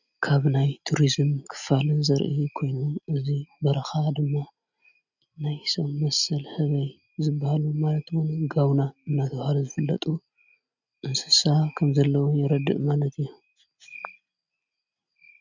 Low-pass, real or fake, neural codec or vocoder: 7.2 kHz; real; none